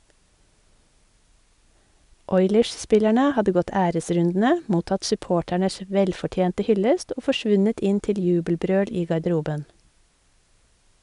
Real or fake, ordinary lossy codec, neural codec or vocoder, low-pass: real; none; none; 10.8 kHz